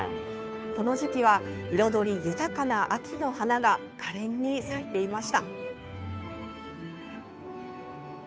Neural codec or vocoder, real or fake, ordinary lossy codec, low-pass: codec, 16 kHz, 2 kbps, FunCodec, trained on Chinese and English, 25 frames a second; fake; none; none